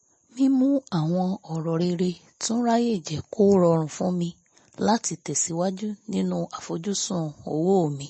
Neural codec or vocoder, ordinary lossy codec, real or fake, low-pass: none; MP3, 32 kbps; real; 10.8 kHz